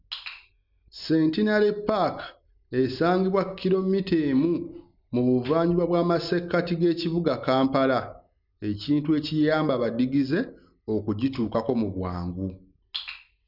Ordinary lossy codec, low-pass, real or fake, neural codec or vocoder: none; 5.4 kHz; real; none